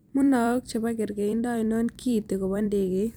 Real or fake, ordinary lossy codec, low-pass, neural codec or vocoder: fake; none; none; vocoder, 44.1 kHz, 128 mel bands every 256 samples, BigVGAN v2